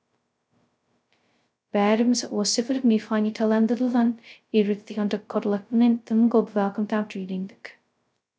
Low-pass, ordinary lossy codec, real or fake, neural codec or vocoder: none; none; fake; codec, 16 kHz, 0.2 kbps, FocalCodec